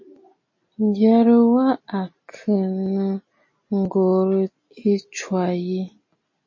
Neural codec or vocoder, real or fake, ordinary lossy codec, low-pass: none; real; MP3, 32 kbps; 7.2 kHz